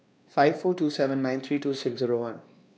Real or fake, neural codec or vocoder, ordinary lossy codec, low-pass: fake; codec, 16 kHz, 2 kbps, X-Codec, WavLM features, trained on Multilingual LibriSpeech; none; none